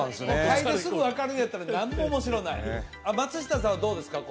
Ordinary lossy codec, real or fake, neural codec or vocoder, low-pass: none; real; none; none